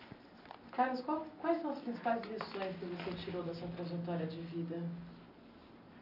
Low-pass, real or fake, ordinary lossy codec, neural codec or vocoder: 5.4 kHz; real; none; none